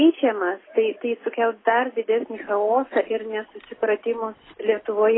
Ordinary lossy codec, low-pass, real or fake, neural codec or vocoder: AAC, 16 kbps; 7.2 kHz; real; none